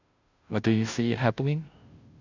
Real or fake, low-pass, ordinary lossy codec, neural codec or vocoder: fake; 7.2 kHz; none; codec, 16 kHz, 0.5 kbps, FunCodec, trained on Chinese and English, 25 frames a second